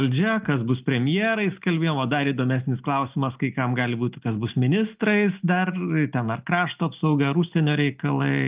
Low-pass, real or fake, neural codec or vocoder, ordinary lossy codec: 3.6 kHz; real; none; Opus, 32 kbps